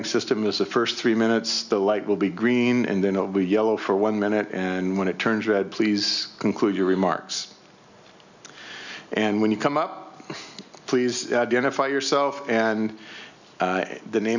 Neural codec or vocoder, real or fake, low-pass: none; real; 7.2 kHz